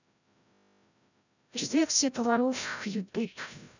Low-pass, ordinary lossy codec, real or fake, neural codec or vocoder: 7.2 kHz; none; fake; codec, 16 kHz, 0.5 kbps, FreqCodec, larger model